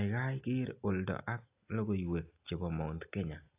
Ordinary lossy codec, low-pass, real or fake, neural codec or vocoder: AAC, 32 kbps; 3.6 kHz; real; none